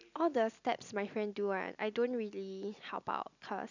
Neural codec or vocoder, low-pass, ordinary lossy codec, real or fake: none; 7.2 kHz; none; real